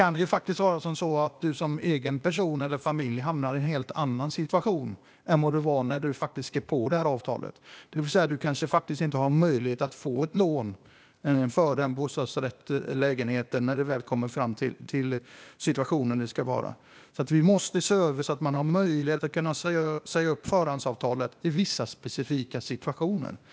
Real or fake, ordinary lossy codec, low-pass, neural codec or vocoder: fake; none; none; codec, 16 kHz, 0.8 kbps, ZipCodec